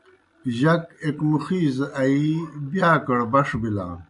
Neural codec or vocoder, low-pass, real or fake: none; 10.8 kHz; real